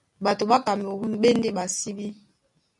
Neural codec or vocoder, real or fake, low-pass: none; real; 10.8 kHz